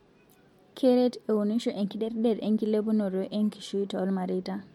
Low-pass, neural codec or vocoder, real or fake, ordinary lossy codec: 19.8 kHz; none; real; MP3, 64 kbps